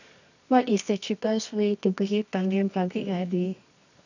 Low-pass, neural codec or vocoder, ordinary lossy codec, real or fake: 7.2 kHz; codec, 24 kHz, 0.9 kbps, WavTokenizer, medium music audio release; none; fake